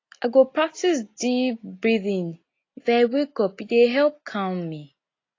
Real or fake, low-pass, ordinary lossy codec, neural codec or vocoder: real; 7.2 kHz; AAC, 32 kbps; none